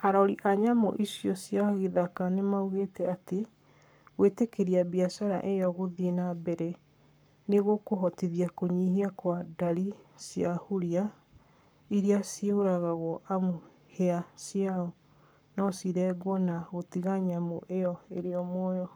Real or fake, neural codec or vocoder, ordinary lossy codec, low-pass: fake; codec, 44.1 kHz, 7.8 kbps, Pupu-Codec; none; none